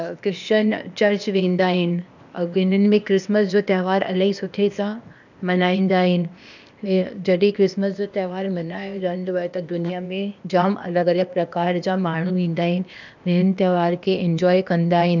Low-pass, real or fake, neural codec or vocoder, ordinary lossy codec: 7.2 kHz; fake; codec, 16 kHz, 0.8 kbps, ZipCodec; none